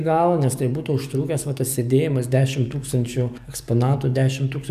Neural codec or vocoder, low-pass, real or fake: codec, 44.1 kHz, 7.8 kbps, DAC; 14.4 kHz; fake